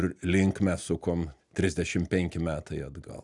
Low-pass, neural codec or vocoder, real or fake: 10.8 kHz; none; real